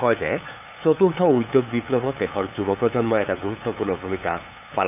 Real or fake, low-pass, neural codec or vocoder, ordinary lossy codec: fake; 3.6 kHz; codec, 16 kHz, 8 kbps, FunCodec, trained on LibriTTS, 25 frames a second; none